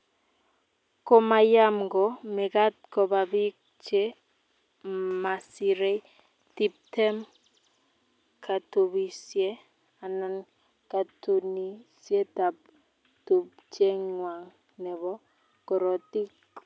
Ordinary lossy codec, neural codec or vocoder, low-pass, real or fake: none; none; none; real